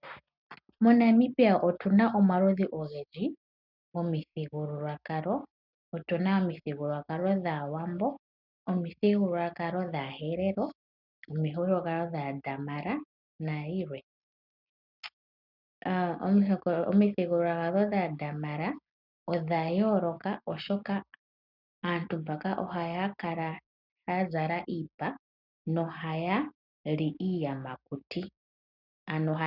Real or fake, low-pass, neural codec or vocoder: real; 5.4 kHz; none